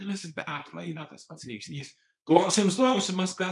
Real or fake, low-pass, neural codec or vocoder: fake; 10.8 kHz; codec, 24 kHz, 0.9 kbps, WavTokenizer, small release